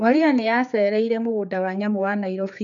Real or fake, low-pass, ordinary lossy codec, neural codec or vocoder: fake; 7.2 kHz; none; codec, 16 kHz, 4 kbps, X-Codec, HuBERT features, trained on general audio